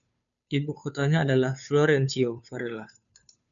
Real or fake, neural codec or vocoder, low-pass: fake; codec, 16 kHz, 2 kbps, FunCodec, trained on Chinese and English, 25 frames a second; 7.2 kHz